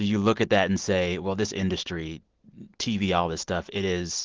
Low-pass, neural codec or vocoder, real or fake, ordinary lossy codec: 7.2 kHz; none; real; Opus, 16 kbps